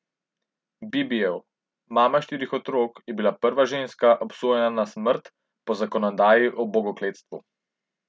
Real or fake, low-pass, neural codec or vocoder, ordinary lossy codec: real; none; none; none